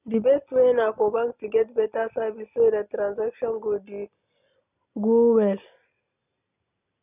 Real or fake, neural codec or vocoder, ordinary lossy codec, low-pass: real; none; none; 3.6 kHz